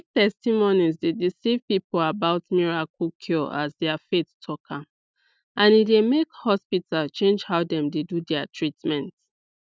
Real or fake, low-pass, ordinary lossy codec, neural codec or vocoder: real; none; none; none